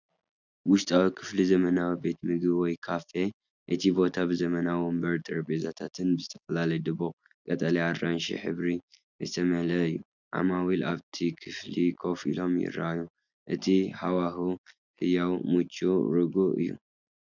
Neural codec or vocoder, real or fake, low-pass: none; real; 7.2 kHz